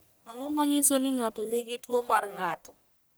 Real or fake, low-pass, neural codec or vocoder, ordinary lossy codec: fake; none; codec, 44.1 kHz, 1.7 kbps, Pupu-Codec; none